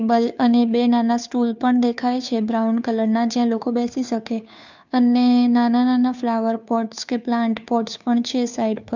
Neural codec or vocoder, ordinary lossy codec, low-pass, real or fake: codec, 16 kHz, 2 kbps, FunCodec, trained on Chinese and English, 25 frames a second; none; 7.2 kHz; fake